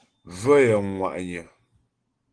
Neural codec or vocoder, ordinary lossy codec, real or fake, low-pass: none; Opus, 16 kbps; real; 9.9 kHz